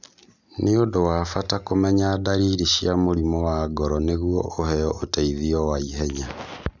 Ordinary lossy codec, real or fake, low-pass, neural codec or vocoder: none; real; 7.2 kHz; none